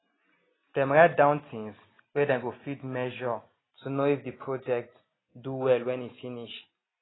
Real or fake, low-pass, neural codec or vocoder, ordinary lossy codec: real; 7.2 kHz; none; AAC, 16 kbps